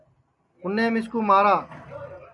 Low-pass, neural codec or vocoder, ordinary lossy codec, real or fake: 10.8 kHz; none; MP3, 96 kbps; real